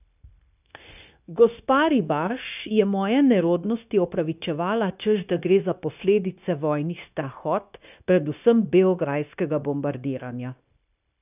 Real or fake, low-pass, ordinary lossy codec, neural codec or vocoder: fake; 3.6 kHz; none; codec, 16 kHz, 0.9 kbps, LongCat-Audio-Codec